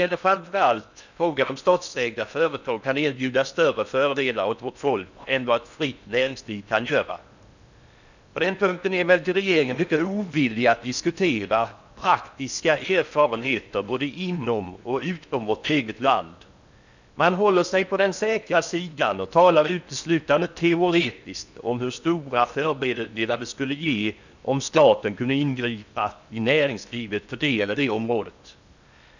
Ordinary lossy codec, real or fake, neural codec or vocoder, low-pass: none; fake; codec, 16 kHz in and 24 kHz out, 0.8 kbps, FocalCodec, streaming, 65536 codes; 7.2 kHz